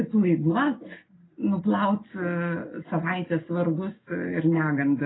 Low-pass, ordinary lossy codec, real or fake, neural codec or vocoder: 7.2 kHz; AAC, 16 kbps; real; none